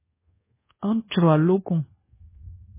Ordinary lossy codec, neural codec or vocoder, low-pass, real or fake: MP3, 16 kbps; codec, 24 kHz, 1.2 kbps, DualCodec; 3.6 kHz; fake